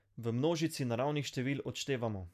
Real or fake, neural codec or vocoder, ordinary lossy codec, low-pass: real; none; none; 14.4 kHz